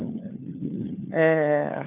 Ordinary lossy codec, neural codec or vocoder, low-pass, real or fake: none; codec, 16 kHz, 4 kbps, FunCodec, trained on LibriTTS, 50 frames a second; 3.6 kHz; fake